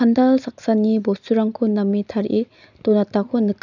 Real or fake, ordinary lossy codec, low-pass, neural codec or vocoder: real; none; 7.2 kHz; none